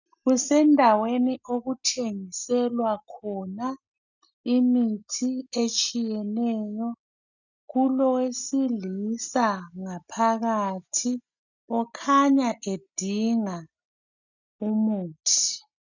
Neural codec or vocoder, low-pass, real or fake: none; 7.2 kHz; real